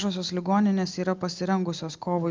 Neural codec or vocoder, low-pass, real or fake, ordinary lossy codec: none; 7.2 kHz; real; Opus, 32 kbps